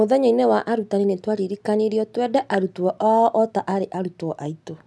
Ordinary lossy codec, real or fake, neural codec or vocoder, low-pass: none; real; none; none